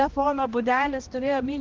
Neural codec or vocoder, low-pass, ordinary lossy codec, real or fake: codec, 16 kHz, 1 kbps, X-Codec, HuBERT features, trained on balanced general audio; 7.2 kHz; Opus, 16 kbps; fake